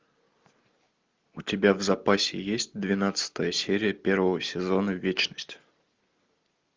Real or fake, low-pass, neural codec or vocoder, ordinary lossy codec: real; 7.2 kHz; none; Opus, 32 kbps